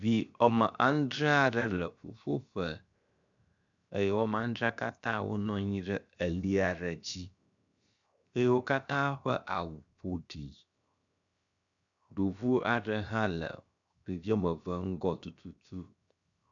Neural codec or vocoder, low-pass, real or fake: codec, 16 kHz, 0.7 kbps, FocalCodec; 7.2 kHz; fake